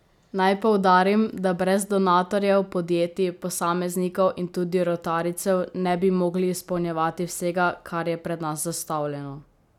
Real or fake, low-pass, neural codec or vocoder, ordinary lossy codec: real; 19.8 kHz; none; none